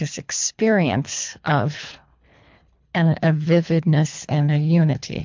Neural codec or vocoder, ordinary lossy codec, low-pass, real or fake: codec, 24 kHz, 3 kbps, HILCodec; MP3, 64 kbps; 7.2 kHz; fake